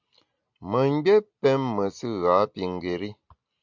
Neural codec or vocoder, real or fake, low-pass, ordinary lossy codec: none; real; 7.2 kHz; MP3, 64 kbps